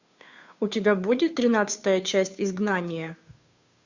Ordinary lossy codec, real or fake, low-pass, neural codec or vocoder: Opus, 64 kbps; fake; 7.2 kHz; codec, 16 kHz, 2 kbps, FunCodec, trained on Chinese and English, 25 frames a second